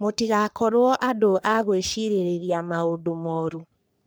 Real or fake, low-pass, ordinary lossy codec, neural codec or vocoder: fake; none; none; codec, 44.1 kHz, 3.4 kbps, Pupu-Codec